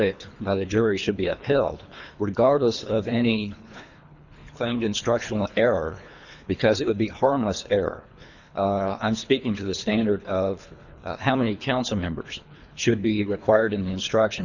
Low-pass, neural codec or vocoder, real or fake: 7.2 kHz; codec, 24 kHz, 3 kbps, HILCodec; fake